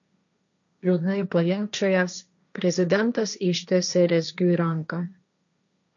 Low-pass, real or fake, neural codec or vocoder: 7.2 kHz; fake; codec, 16 kHz, 1.1 kbps, Voila-Tokenizer